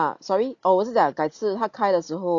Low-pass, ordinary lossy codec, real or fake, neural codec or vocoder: 7.2 kHz; none; real; none